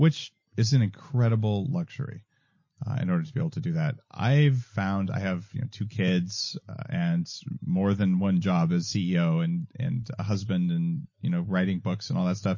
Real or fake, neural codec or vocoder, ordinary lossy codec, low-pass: real; none; MP3, 32 kbps; 7.2 kHz